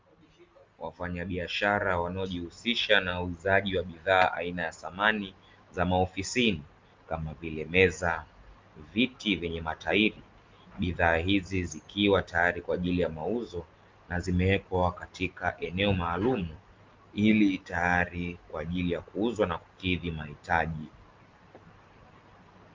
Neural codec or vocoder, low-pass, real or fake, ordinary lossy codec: none; 7.2 kHz; real; Opus, 32 kbps